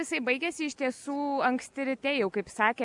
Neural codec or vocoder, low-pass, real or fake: none; 10.8 kHz; real